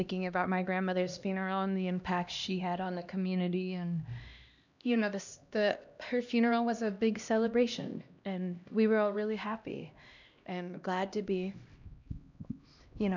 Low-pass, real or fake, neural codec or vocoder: 7.2 kHz; fake; codec, 16 kHz, 1 kbps, X-Codec, HuBERT features, trained on LibriSpeech